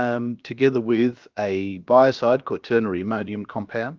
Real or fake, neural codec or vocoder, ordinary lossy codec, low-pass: fake; codec, 16 kHz, about 1 kbps, DyCAST, with the encoder's durations; Opus, 24 kbps; 7.2 kHz